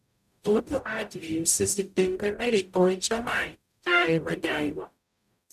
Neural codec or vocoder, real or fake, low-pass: codec, 44.1 kHz, 0.9 kbps, DAC; fake; 14.4 kHz